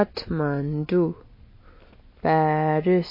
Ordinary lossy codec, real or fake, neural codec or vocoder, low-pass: MP3, 24 kbps; real; none; 5.4 kHz